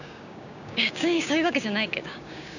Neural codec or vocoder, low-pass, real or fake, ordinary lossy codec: codec, 16 kHz, 6 kbps, DAC; 7.2 kHz; fake; none